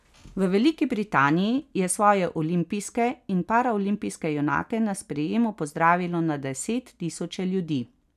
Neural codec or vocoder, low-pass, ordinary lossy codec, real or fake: none; 14.4 kHz; none; real